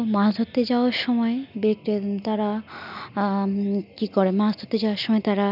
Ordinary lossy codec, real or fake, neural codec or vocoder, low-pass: none; real; none; 5.4 kHz